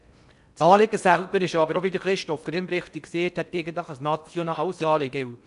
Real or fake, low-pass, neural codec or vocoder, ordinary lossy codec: fake; 10.8 kHz; codec, 16 kHz in and 24 kHz out, 0.6 kbps, FocalCodec, streaming, 4096 codes; none